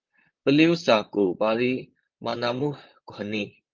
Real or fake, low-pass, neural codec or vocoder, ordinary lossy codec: fake; 7.2 kHz; vocoder, 44.1 kHz, 128 mel bands, Pupu-Vocoder; Opus, 24 kbps